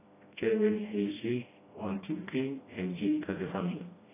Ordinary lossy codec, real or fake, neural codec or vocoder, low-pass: AAC, 16 kbps; fake; codec, 16 kHz, 1 kbps, FreqCodec, smaller model; 3.6 kHz